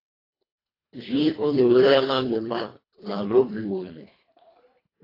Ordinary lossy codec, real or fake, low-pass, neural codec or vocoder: AAC, 24 kbps; fake; 5.4 kHz; codec, 24 kHz, 1.5 kbps, HILCodec